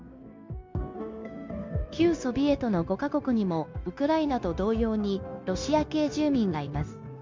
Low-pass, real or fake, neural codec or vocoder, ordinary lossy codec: 7.2 kHz; fake; codec, 16 kHz, 0.9 kbps, LongCat-Audio-Codec; MP3, 64 kbps